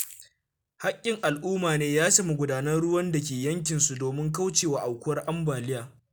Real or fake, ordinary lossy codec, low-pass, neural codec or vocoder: real; none; none; none